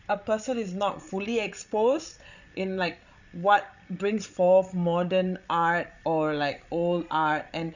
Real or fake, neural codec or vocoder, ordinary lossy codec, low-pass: fake; codec, 16 kHz, 16 kbps, FreqCodec, larger model; none; 7.2 kHz